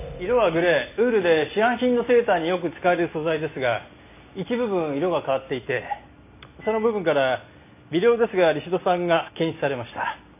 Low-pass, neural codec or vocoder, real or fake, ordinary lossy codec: 3.6 kHz; none; real; none